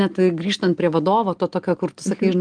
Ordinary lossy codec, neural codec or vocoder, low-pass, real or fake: Opus, 24 kbps; none; 9.9 kHz; real